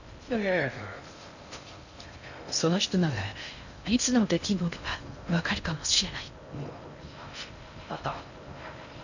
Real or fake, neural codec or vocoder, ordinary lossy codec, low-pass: fake; codec, 16 kHz in and 24 kHz out, 0.6 kbps, FocalCodec, streaming, 2048 codes; none; 7.2 kHz